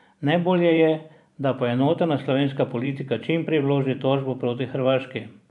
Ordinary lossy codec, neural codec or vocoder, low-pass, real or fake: none; vocoder, 44.1 kHz, 128 mel bands every 512 samples, BigVGAN v2; 10.8 kHz; fake